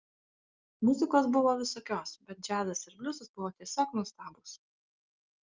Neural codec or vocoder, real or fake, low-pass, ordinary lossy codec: none; real; 7.2 kHz; Opus, 32 kbps